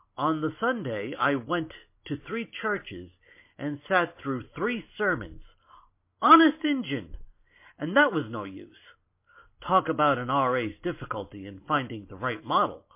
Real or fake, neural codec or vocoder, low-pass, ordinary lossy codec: real; none; 3.6 kHz; MP3, 24 kbps